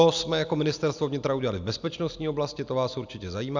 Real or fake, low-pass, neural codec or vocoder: real; 7.2 kHz; none